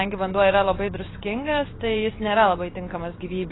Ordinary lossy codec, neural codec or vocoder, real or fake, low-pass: AAC, 16 kbps; vocoder, 24 kHz, 100 mel bands, Vocos; fake; 7.2 kHz